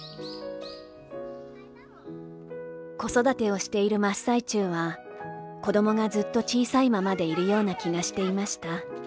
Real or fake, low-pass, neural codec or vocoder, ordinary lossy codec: real; none; none; none